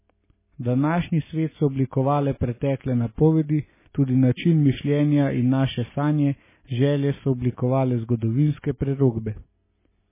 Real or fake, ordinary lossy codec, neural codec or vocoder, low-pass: real; MP3, 16 kbps; none; 3.6 kHz